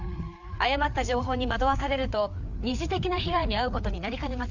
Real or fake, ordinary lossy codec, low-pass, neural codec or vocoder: fake; none; 7.2 kHz; codec, 16 kHz, 4 kbps, FreqCodec, larger model